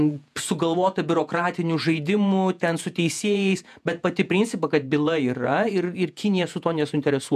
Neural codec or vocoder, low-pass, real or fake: vocoder, 48 kHz, 128 mel bands, Vocos; 14.4 kHz; fake